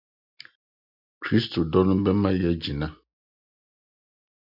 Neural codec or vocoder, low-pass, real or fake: none; 5.4 kHz; real